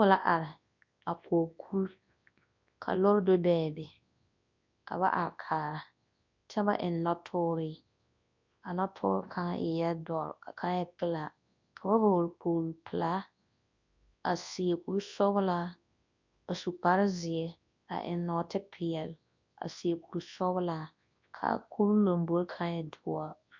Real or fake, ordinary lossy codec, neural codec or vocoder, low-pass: fake; MP3, 48 kbps; codec, 24 kHz, 0.9 kbps, WavTokenizer, large speech release; 7.2 kHz